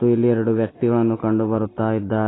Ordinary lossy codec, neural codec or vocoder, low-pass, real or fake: AAC, 16 kbps; none; 7.2 kHz; real